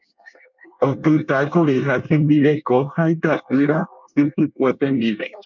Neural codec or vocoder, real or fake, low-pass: codec, 24 kHz, 1 kbps, SNAC; fake; 7.2 kHz